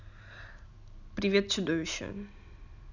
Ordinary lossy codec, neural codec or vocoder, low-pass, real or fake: none; none; 7.2 kHz; real